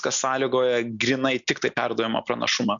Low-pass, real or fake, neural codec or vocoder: 7.2 kHz; real; none